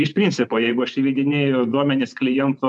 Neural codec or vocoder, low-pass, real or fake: vocoder, 24 kHz, 100 mel bands, Vocos; 10.8 kHz; fake